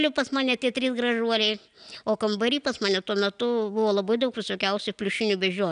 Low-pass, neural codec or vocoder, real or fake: 9.9 kHz; none; real